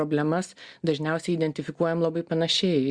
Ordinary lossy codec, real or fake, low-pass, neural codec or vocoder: Opus, 64 kbps; real; 9.9 kHz; none